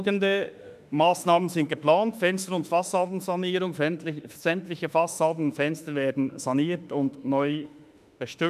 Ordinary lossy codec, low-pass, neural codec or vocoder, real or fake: none; 14.4 kHz; autoencoder, 48 kHz, 32 numbers a frame, DAC-VAE, trained on Japanese speech; fake